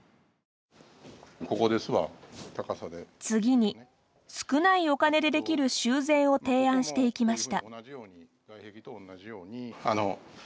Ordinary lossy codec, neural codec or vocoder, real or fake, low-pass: none; none; real; none